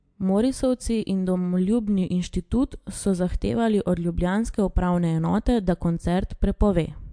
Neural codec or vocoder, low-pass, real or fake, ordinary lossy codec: none; 9.9 kHz; real; MP3, 64 kbps